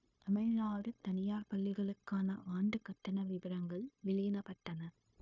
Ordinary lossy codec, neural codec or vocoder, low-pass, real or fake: none; codec, 16 kHz, 0.9 kbps, LongCat-Audio-Codec; 7.2 kHz; fake